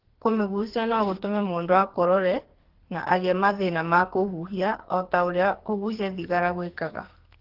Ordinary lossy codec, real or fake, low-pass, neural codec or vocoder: Opus, 16 kbps; fake; 5.4 kHz; codec, 44.1 kHz, 2.6 kbps, SNAC